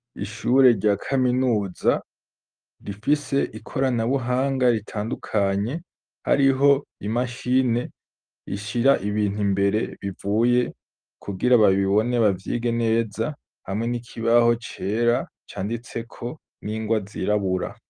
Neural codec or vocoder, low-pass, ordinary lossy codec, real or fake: none; 9.9 kHz; Opus, 32 kbps; real